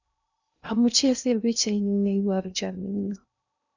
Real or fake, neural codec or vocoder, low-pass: fake; codec, 16 kHz in and 24 kHz out, 0.6 kbps, FocalCodec, streaming, 2048 codes; 7.2 kHz